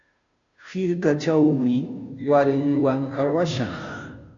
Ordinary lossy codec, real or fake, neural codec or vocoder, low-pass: MP3, 48 kbps; fake; codec, 16 kHz, 0.5 kbps, FunCodec, trained on Chinese and English, 25 frames a second; 7.2 kHz